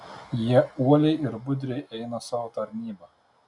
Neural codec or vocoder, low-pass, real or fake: none; 10.8 kHz; real